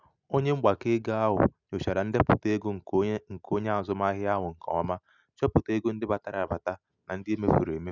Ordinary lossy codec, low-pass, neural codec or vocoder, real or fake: none; 7.2 kHz; none; real